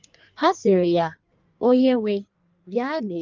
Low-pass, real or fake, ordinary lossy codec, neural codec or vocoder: 7.2 kHz; fake; Opus, 24 kbps; codec, 16 kHz in and 24 kHz out, 1.1 kbps, FireRedTTS-2 codec